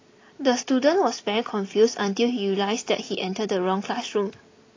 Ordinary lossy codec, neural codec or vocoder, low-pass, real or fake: AAC, 32 kbps; none; 7.2 kHz; real